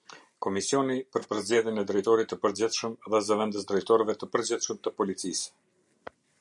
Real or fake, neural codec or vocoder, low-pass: real; none; 10.8 kHz